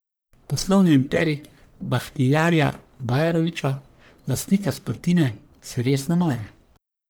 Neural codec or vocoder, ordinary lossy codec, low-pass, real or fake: codec, 44.1 kHz, 1.7 kbps, Pupu-Codec; none; none; fake